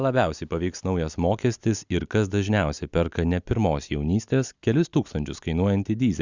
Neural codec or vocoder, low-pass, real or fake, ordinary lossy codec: none; 7.2 kHz; real; Opus, 64 kbps